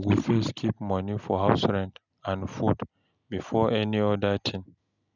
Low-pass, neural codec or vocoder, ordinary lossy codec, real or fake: 7.2 kHz; none; none; real